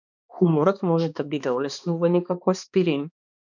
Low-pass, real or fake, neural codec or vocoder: 7.2 kHz; fake; codec, 16 kHz, 2 kbps, X-Codec, HuBERT features, trained on balanced general audio